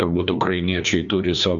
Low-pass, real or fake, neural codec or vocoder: 7.2 kHz; fake; codec, 16 kHz, 2 kbps, FreqCodec, larger model